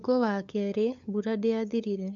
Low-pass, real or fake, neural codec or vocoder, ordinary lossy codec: 7.2 kHz; fake; codec, 16 kHz, 8 kbps, FunCodec, trained on LibriTTS, 25 frames a second; none